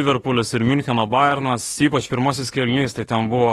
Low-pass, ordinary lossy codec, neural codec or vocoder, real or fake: 19.8 kHz; AAC, 32 kbps; autoencoder, 48 kHz, 32 numbers a frame, DAC-VAE, trained on Japanese speech; fake